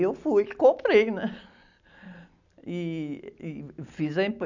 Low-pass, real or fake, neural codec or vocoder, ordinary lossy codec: 7.2 kHz; real; none; none